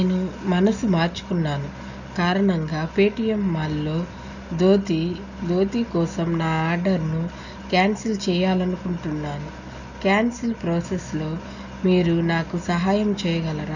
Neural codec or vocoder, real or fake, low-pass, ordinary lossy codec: none; real; 7.2 kHz; none